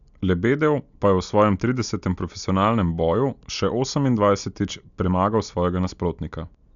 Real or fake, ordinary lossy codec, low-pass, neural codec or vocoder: real; none; 7.2 kHz; none